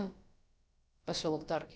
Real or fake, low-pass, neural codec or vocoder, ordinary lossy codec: fake; none; codec, 16 kHz, about 1 kbps, DyCAST, with the encoder's durations; none